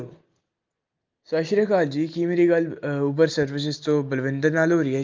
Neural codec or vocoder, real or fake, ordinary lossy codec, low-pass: none; real; Opus, 32 kbps; 7.2 kHz